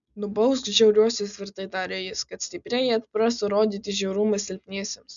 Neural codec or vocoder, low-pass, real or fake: none; 7.2 kHz; real